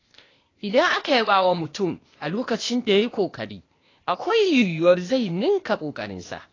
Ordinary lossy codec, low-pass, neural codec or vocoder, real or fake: AAC, 32 kbps; 7.2 kHz; codec, 16 kHz, 0.8 kbps, ZipCodec; fake